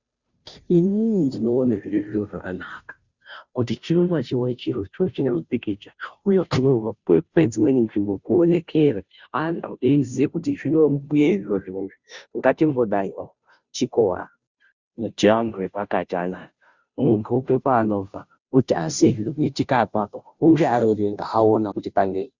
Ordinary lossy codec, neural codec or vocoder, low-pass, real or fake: Opus, 64 kbps; codec, 16 kHz, 0.5 kbps, FunCodec, trained on Chinese and English, 25 frames a second; 7.2 kHz; fake